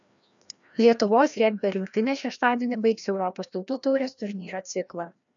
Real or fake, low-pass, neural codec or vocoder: fake; 7.2 kHz; codec, 16 kHz, 1 kbps, FreqCodec, larger model